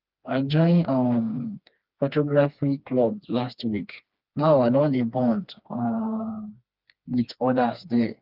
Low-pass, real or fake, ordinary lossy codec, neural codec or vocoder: 5.4 kHz; fake; Opus, 24 kbps; codec, 16 kHz, 2 kbps, FreqCodec, smaller model